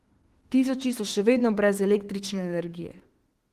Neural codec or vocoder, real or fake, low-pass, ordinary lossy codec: autoencoder, 48 kHz, 32 numbers a frame, DAC-VAE, trained on Japanese speech; fake; 14.4 kHz; Opus, 16 kbps